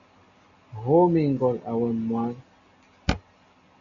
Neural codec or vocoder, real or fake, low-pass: none; real; 7.2 kHz